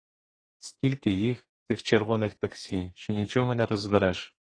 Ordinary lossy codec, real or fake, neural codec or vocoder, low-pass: AAC, 32 kbps; fake; codec, 32 kHz, 1.9 kbps, SNAC; 9.9 kHz